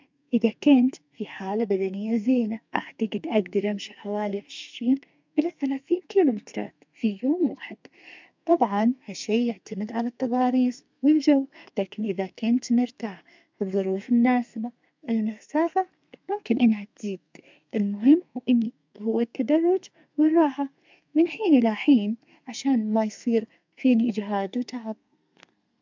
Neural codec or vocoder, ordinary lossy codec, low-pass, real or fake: codec, 32 kHz, 1.9 kbps, SNAC; MP3, 64 kbps; 7.2 kHz; fake